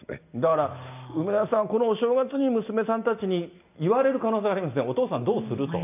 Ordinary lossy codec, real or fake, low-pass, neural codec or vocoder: none; real; 3.6 kHz; none